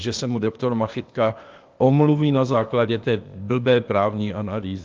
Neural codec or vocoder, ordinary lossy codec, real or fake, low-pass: codec, 16 kHz, 0.8 kbps, ZipCodec; Opus, 32 kbps; fake; 7.2 kHz